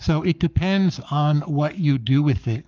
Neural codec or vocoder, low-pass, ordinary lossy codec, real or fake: codec, 44.1 kHz, 7.8 kbps, Pupu-Codec; 7.2 kHz; Opus, 24 kbps; fake